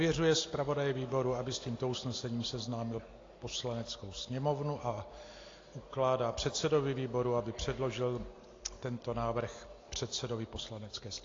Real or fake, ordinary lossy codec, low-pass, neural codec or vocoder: real; AAC, 32 kbps; 7.2 kHz; none